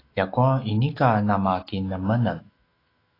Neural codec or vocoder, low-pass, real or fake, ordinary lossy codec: autoencoder, 48 kHz, 128 numbers a frame, DAC-VAE, trained on Japanese speech; 5.4 kHz; fake; AAC, 24 kbps